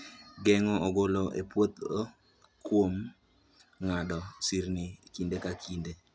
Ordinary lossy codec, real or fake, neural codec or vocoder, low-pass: none; real; none; none